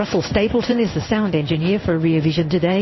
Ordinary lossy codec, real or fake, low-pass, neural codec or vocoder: MP3, 24 kbps; fake; 7.2 kHz; codec, 16 kHz in and 24 kHz out, 1 kbps, XY-Tokenizer